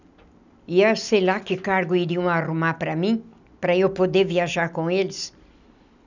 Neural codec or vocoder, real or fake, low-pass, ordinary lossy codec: none; real; 7.2 kHz; none